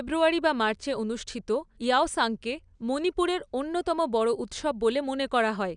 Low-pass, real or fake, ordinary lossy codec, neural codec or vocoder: 9.9 kHz; real; none; none